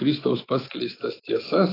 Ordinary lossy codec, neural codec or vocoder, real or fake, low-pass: AAC, 24 kbps; autoencoder, 48 kHz, 128 numbers a frame, DAC-VAE, trained on Japanese speech; fake; 5.4 kHz